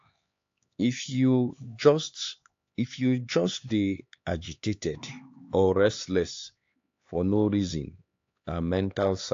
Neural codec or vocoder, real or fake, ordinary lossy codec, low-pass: codec, 16 kHz, 4 kbps, X-Codec, HuBERT features, trained on LibriSpeech; fake; AAC, 48 kbps; 7.2 kHz